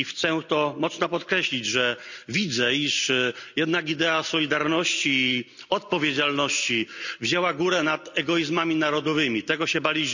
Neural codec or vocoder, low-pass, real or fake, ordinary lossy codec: none; 7.2 kHz; real; none